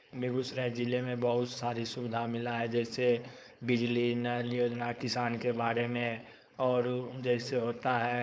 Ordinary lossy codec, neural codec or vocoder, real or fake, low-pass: none; codec, 16 kHz, 4.8 kbps, FACodec; fake; none